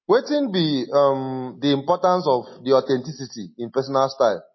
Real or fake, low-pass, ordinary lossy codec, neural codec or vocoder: real; 7.2 kHz; MP3, 24 kbps; none